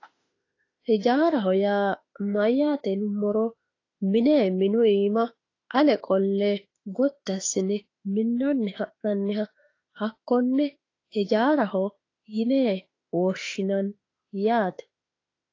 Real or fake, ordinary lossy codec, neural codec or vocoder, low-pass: fake; AAC, 32 kbps; autoencoder, 48 kHz, 32 numbers a frame, DAC-VAE, trained on Japanese speech; 7.2 kHz